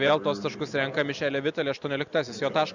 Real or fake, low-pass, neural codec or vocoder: real; 7.2 kHz; none